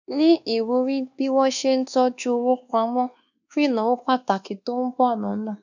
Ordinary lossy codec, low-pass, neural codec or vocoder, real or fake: none; 7.2 kHz; codec, 24 kHz, 1.2 kbps, DualCodec; fake